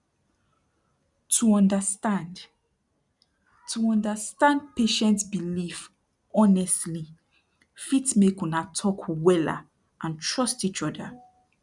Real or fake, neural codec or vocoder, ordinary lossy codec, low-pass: real; none; none; 10.8 kHz